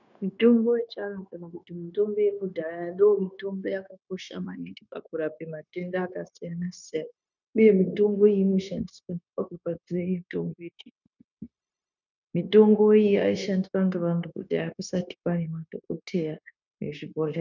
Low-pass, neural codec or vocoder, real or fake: 7.2 kHz; codec, 16 kHz, 0.9 kbps, LongCat-Audio-Codec; fake